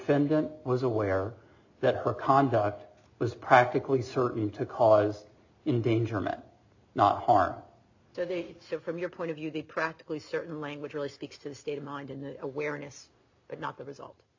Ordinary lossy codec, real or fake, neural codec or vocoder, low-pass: AAC, 48 kbps; real; none; 7.2 kHz